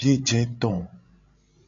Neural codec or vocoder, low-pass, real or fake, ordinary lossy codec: codec, 16 kHz, 16 kbps, FreqCodec, larger model; 7.2 kHz; fake; AAC, 48 kbps